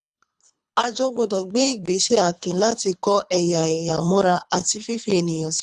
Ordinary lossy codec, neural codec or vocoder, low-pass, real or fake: none; codec, 24 kHz, 3 kbps, HILCodec; none; fake